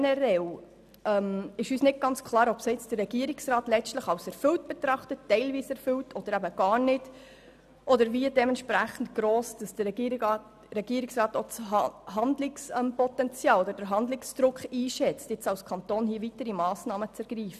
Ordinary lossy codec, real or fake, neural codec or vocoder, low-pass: none; real; none; 14.4 kHz